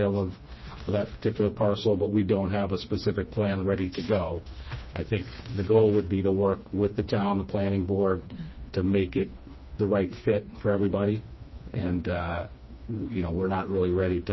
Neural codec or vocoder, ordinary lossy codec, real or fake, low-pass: codec, 16 kHz, 2 kbps, FreqCodec, smaller model; MP3, 24 kbps; fake; 7.2 kHz